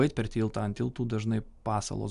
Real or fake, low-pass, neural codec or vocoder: real; 10.8 kHz; none